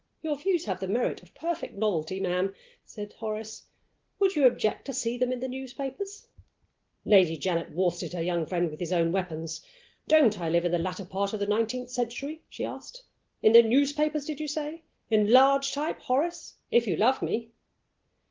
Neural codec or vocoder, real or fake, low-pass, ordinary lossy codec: none; real; 7.2 kHz; Opus, 16 kbps